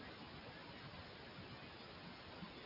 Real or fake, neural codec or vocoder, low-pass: real; none; 5.4 kHz